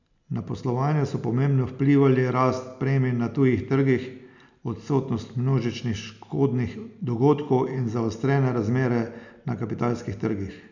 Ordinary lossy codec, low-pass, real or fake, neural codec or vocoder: none; 7.2 kHz; real; none